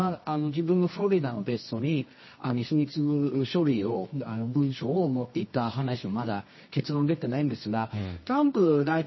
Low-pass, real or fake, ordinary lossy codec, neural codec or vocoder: 7.2 kHz; fake; MP3, 24 kbps; codec, 24 kHz, 0.9 kbps, WavTokenizer, medium music audio release